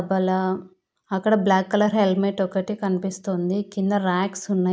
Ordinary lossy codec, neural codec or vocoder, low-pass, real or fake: none; none; none; real